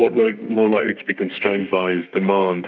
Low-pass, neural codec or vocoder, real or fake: 7.2 kHz; codec, 32 kHz, 1.9 kbps, SNAC; fake